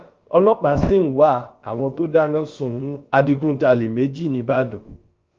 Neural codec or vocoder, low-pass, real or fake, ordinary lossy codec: codec, 16 kHz, about 1 kbps, DyCAST, with the encoder's durations; 7.2 kHz; fake; Opus, 24 kbps